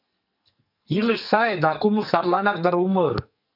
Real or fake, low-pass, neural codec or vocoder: fake; 5.4 kHz; codec, 44.1 kHz, 2.6 kbps, SNAC